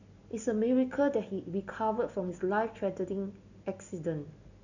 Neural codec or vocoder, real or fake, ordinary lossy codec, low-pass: none; real; none; 7.2 kHz